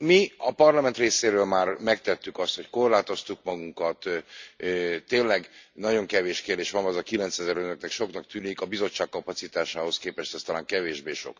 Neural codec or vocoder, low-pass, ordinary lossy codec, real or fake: none; 7.2 kHz; MP3, 64 kbps; real